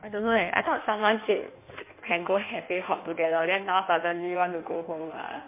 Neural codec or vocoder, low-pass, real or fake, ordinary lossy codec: codec, 16 kHz in and 24 kHz out, 1.1 kbps, FireRedTTS-2 codec; 3.6 kHz; fake; MP3, 24 kbps